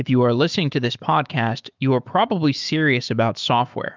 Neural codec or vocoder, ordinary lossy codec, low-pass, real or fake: autoencoder, 48 kHz, 128 numbers a frame, DAC-VAE, trained on Japanese speech; Opus, 32 kbps; 7.2 kHz; fake